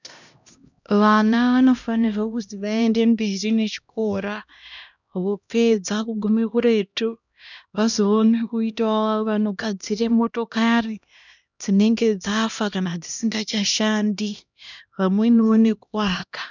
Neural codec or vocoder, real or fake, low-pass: codec, 16 kHz, 1 kbps, X-Codec, HuBERT features, trained on LibriSpeech; fake; 7.2 kHz